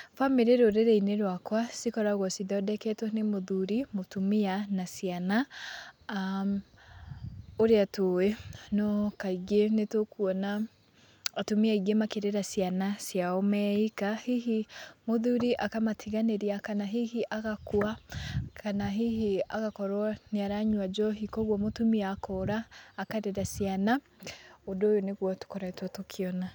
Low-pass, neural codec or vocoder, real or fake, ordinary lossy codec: 19.8 kHz; none; real; none